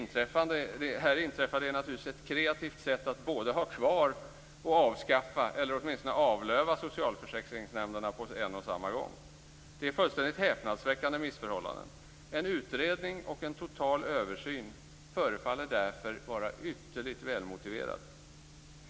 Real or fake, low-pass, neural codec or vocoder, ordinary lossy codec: real; none; none; none